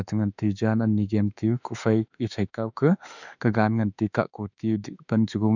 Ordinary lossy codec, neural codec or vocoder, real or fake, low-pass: none; autoencoder, 48 kHz, 32 numbers a frame, DAC-VAE, trained on Japanese speech; fake; 7.2 kHz